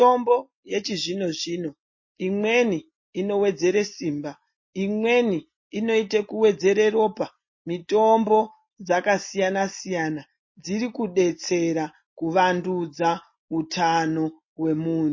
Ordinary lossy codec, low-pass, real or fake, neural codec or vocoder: MP3, 32 kbps; 7.2 kHz; real; none